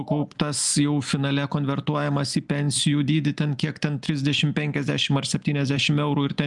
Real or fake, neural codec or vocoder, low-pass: real; none; 10.8 kHz